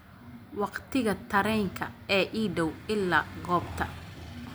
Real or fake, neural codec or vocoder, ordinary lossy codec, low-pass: real; none; none; none